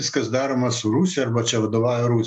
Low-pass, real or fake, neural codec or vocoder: 10.8 kHz; real; none